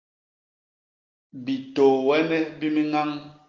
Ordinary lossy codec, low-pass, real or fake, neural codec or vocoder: Opus, 24 kbps; 7.2 kHz; real; none